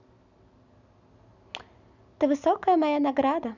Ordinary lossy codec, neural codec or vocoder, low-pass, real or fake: none; none; 7.2 kHz; real